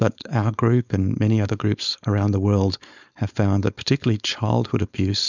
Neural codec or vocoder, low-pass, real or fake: codec, 16 kHz, 4.8 kbps, FACodec; 7.2 kHz; fake